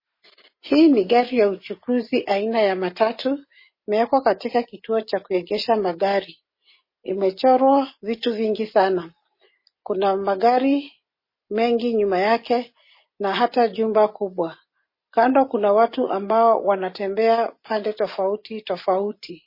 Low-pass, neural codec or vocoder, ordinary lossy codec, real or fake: 5.4 kHz; none; MP3, 24 kbps; real